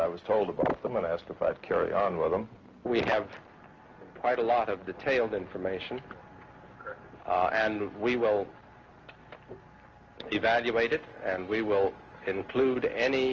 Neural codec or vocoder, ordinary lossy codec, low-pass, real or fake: none; Opus, 24 kbps; 7.2 kHz; real